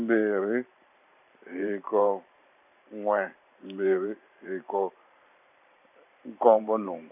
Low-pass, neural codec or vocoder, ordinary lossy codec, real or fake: 3.6 kHz; vocoder, 44.1 kHz, 128 mel bands every 512 samples, BigVGAN v2; none; fake